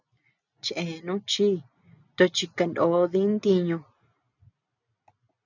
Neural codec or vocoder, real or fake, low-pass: none; real; 7.2 kHz